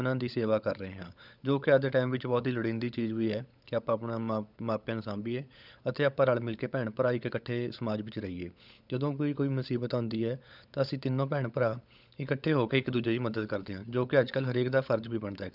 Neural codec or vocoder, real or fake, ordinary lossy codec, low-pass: codec, 16 kHz, 16 kbps, FunCodec, trained on LibriTTS, 50 frames a second; fake; none; 5.4 kHz